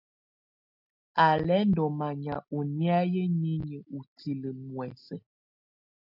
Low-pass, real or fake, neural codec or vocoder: 5.4 kHz; real; none